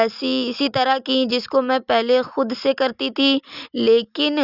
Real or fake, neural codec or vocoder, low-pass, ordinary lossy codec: real; none; 5.4 kHz; Opus, 64 kbps